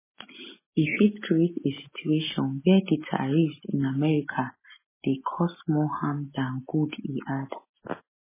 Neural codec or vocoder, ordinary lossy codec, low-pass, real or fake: none; MP3, 16 kbps; 3.6 kHz; real